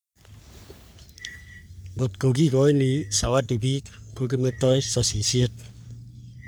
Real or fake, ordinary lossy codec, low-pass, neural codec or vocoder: fake; none; none; codec, 44.1 kHz, 3.4 kbps, Pupu-Codec